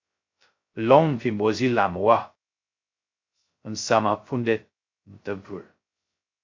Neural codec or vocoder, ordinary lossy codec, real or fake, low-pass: codec, 16 kHz, 0.2 kbps, FocalCodec; MP3, 48 kbps; fake; 7.2 kHz